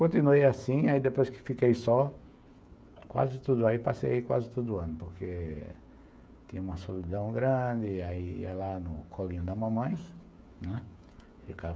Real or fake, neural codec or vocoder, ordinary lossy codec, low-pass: fake; codec, 16 kHz, 16 kbps, FreqCodec, smaller model; none; none